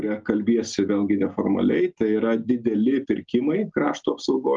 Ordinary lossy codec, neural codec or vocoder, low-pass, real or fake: Opus, 32 kbps; none; 7.2 kHz; real